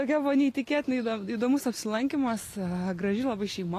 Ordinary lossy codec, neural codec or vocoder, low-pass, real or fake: AAC, 48 kbps; none; 14.4 kHz; real